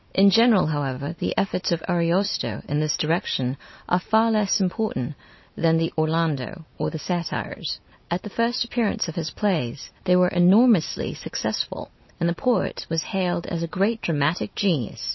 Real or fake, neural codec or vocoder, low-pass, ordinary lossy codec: real; none; 7.2 kHz; MP3, 24 kbps